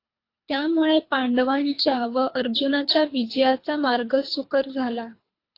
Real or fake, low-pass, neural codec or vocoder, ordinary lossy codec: fake; 5.4 kHz; codec, 24 kHz, 3 kbps, HILCodec; AAC, 32 kbps